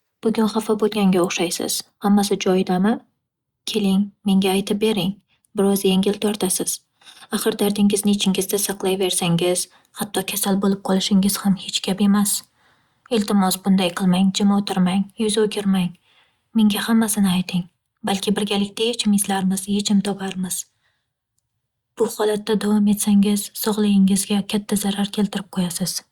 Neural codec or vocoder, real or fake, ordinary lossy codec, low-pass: none; real; Opus, 64 kbps; 19.8 kHz